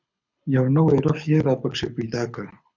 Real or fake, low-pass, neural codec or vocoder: fake; 7.2 kHz; codec, 24 kHz, 6 kbps, HILCodec